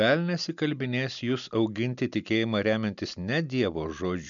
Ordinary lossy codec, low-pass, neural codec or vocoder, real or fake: MP3, 96 kbps; 7.2 kHz; none; real